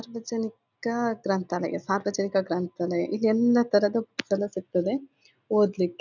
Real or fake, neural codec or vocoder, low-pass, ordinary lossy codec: real; none; 7.2 kHz; none